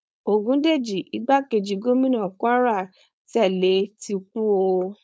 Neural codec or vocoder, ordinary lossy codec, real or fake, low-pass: codec, 16 kHz, 4.8 kbps, FACodec; none; fake; none